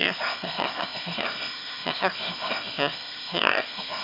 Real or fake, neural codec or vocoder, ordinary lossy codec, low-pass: fake; autoencoder, 22.05 kHz, a latent of 192 numbers a frame, VITS, trained on one speaker; MP3, 48 kbps; 5.4 kHz